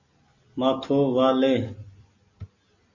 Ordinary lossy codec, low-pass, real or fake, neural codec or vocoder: MP3, 32 kbps; 7.2 kHz; real; none